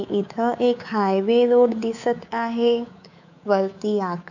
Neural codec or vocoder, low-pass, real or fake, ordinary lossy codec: codec, 24 kHz, 3.1 kbps, DualCodec; 7.2 kHz; fake; none